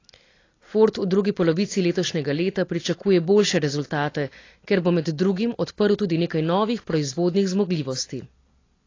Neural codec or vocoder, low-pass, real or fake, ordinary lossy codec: none; 7.2 kHz; real; AAC, 32 kbps